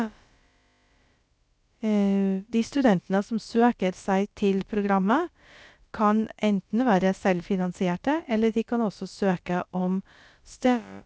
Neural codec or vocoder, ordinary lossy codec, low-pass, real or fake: codec, 16 kHz, about 1 kbps, DyCAST, with the encoder's durations; none; none; fake